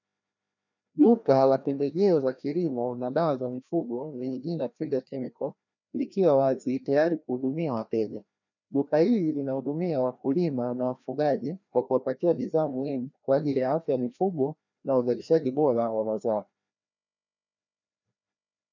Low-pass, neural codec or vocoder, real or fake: 7.2 kHz; codec, 16 kHz, 1 kbps, FreqCodec, larger model; fake